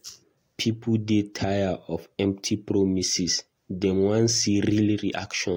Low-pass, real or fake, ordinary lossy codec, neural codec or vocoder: 19.8 kHz; real; AAC, 48 kbps; none